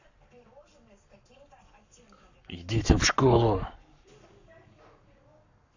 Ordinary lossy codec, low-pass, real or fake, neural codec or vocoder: none; 7.2 kHz; fake; codec, 44.1 kHz, 7.8 kbps, Pupu-Codec